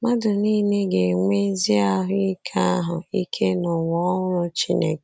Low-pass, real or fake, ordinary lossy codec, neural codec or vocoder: none; real; none; none